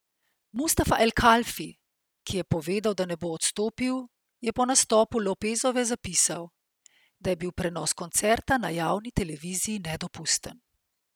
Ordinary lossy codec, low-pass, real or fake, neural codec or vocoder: none; none; real; none